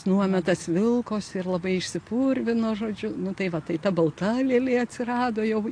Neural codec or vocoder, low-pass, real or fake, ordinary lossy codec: none; 9.9 kHz; real; AAC, 48 kbps